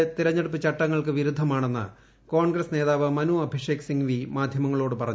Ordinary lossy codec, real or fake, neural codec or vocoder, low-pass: none; real; none; none